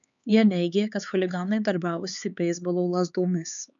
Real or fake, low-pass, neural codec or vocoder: fake; 7.2 kHz; codec, 16 kHz, 4 kbps, X-Codec, HuBERT features, trained on LibriSpeech